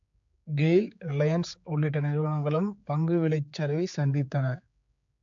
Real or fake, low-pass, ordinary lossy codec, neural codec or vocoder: fake; 7.2 kHz; AAC, 64 kbps; codec, 16 kHz, 4 kbps, X-Codec, HuBERT features, trained on general audio